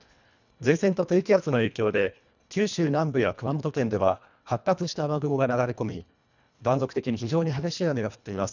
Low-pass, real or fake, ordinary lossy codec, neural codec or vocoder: 7.2 kHz; fake; none; codec, 24 kHz, 1.5 kbps, HILCodec